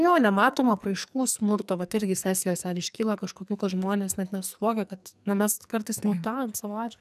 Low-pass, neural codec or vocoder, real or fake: 14.4 kHz; codec, 44.1 kHz, 2.6 kbps, SNAC; fake